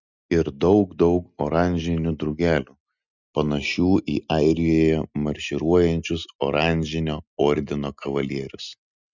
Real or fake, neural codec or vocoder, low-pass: real; none; 7.2 kHz